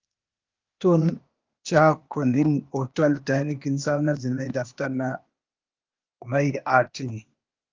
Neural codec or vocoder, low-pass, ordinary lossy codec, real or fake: codec, 16 kHz, 0.8 kbps, ZipCodec; 7.2 kHz; Opus, 24 kbps; fake